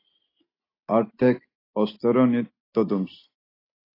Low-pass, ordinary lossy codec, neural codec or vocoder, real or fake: 5.4 kHz; AAC, 24 kbps; none; real